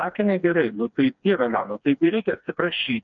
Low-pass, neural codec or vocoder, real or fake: 7.2 kHz; codec, 16 kHz, 2 kbps, FreqCodec, smaller model; fake